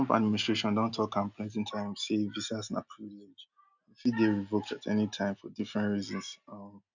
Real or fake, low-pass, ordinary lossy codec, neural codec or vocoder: real; 7.2 kHz; none; none